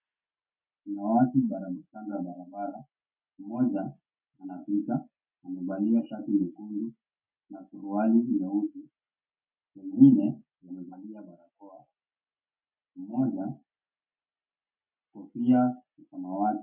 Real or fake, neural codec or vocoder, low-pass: real; none; 3.6 kHz